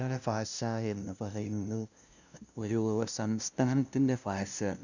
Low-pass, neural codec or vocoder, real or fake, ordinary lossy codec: 7.2 kHz; codec, 16 kHz, 0.5 kbps, FunCodec, trained on LibriTTS, 25 frames a second; fake; none